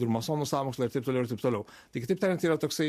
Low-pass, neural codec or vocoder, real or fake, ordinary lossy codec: 14.4 kHz; none; real; MP3, 64 kbps